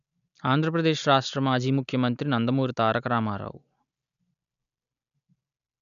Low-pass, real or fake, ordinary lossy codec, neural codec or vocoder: 7.2 kHz; real; none; none